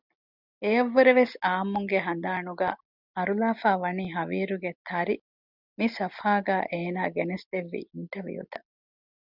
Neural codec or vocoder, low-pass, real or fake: none; 5.4 kHz; real